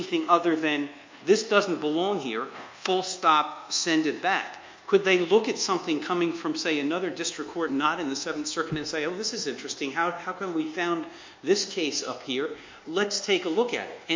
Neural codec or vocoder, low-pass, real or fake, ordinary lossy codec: codec, 24 kHz, 1.2 kbps, DualCodec; 7.2 kHz; fake; MP3, 48 kbps